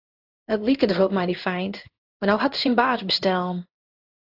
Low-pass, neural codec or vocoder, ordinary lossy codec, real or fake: 5.4 kHz; codec, 16 kHz in and 24 kHz out, 1 kbps, XY-Tokenizer; AAC, 48 kbps; fake